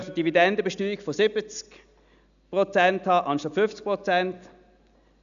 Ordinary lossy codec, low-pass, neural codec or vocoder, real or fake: none; 7.2 kHz; none; real